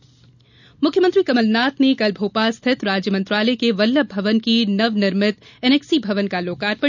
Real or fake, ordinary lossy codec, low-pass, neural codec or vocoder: real; none; 7.2 kHz; none